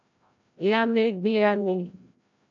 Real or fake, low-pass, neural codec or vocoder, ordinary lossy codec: fake; 7.2 kHz; codec, 16 kHz, 0.5 kbps, FreqCodec, larger model; MP3, 64 kbps